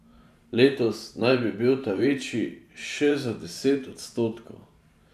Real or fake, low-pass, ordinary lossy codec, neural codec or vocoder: fake; 14.4 kHz; none; vocoder, 44.1 kHz, 128 mel bands every 512 samples, BigVGAN v2